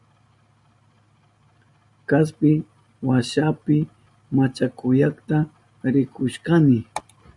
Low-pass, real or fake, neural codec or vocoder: 10.8 kHz; real; none